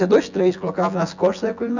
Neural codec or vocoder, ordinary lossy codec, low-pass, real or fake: vocoder, 24 kHz, 100 mel bands, Vocos; none; 7.2 kHz; fake